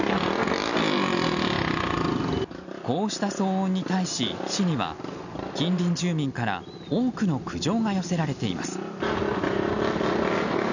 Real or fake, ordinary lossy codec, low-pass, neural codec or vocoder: real; none; 7.2 kHz; none